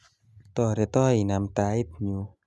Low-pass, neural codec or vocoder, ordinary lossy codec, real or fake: none; none; none; real